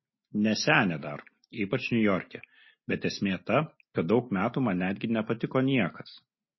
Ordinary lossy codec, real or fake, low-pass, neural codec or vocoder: MP3, 24 kbps; real; 7.2 kHz; none